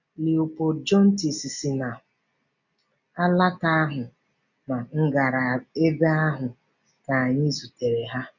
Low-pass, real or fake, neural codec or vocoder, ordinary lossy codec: 7.2 kHz; real; none; none